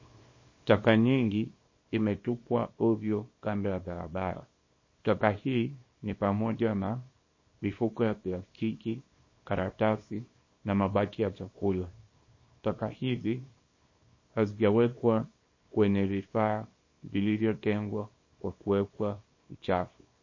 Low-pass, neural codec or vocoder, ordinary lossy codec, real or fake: 7.2 kHz; codec, 24 kHz, 0.9 kbps, WavTokenizer, small release; MP3, 32 kbps; fake